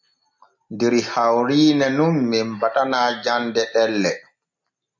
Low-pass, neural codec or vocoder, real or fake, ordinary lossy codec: 7.2 kHz; none; real; MP3, 48 kbps